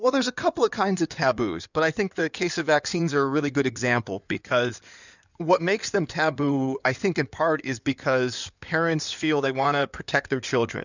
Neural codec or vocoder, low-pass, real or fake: codec, 16 kHz in and 24 kHz out, 2.2 kbps, FireRedTTS-2 codec; 7.2 kHz; fake